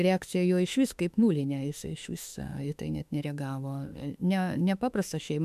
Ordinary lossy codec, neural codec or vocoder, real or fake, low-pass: MP3, 96 kbps; autoencoder, 48 kHz, 32 numbers a frame, DAC-VAE, trained on Japanese speech; fake; 14.4 kHz